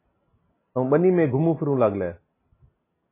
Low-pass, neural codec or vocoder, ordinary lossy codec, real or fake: 3.6 kHz; none; MP3, 16 kbps; real